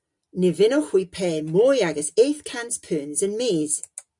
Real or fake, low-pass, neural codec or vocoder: real; 10.8 kHz; none